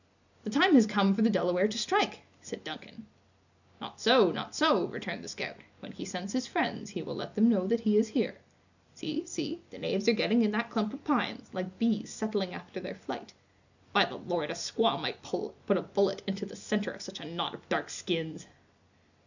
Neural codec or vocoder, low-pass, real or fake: none; 7.2 kHz; real